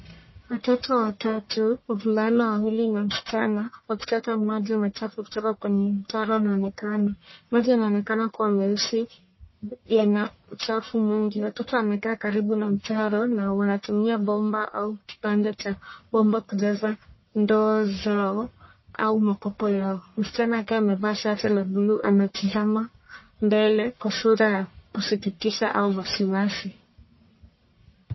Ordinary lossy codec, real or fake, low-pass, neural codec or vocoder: MP3, 24 kbps; fake; 7.2 kHz; codec, 44.1 kHz, 1.7 kbps, Pupu-Codec